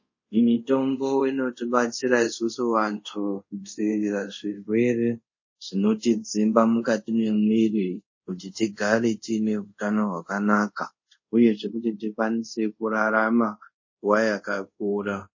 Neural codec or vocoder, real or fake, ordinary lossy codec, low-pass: codec, 24 kHz, 0.5 kbps, DualCodec; fake; MP3, 32 kbps; 7.2 kHz